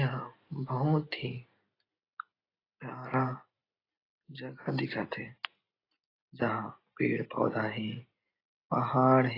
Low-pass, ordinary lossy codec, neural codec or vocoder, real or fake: 5.4 kHz; AAC, 24 kbps; none; real